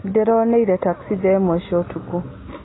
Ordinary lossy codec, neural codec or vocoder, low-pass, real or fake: AAC, 16 kbps; none; 7.2 kHz; real